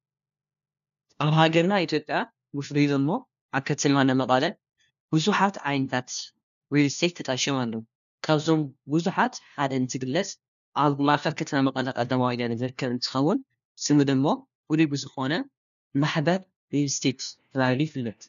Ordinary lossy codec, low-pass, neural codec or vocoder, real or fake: AAC, 96 kbps; 7.2 kHz; codec, 16 kHz, 1 kbps, FunCodec, trained on LibriTTS, 50 frames a second; fake